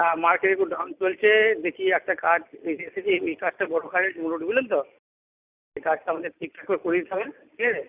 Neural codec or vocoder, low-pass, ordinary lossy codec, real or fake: none; 3.6 kHz; Opus, 64 kbps; real